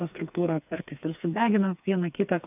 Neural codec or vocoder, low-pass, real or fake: codec, 16 kHz, 4 kbps, FreqCodec, smaller model; 3.6 kHz; fake